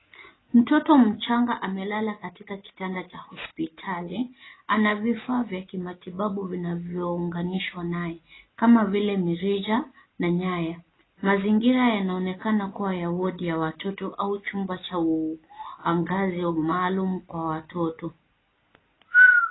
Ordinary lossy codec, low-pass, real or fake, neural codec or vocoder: AAC, 16 kbps; 7.2 kHz; real; none